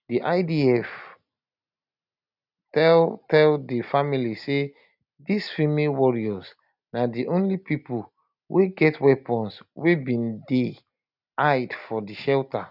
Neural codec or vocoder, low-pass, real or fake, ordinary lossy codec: none; 5.4 kHz; real; none